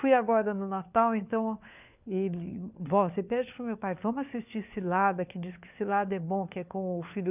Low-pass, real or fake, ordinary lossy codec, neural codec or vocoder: 3.6 kHz; fake; none; codec, 16 kHz, 4 kbps, FunCodec, trained on LibriTTS, 50 frames a second